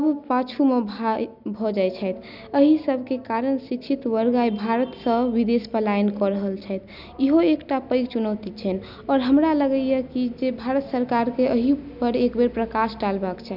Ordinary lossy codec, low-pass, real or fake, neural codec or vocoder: none; 5.4 kHz; real; none